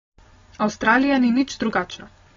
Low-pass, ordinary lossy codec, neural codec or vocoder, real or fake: 7.2 kHz; AAC, 24 kbps; none; real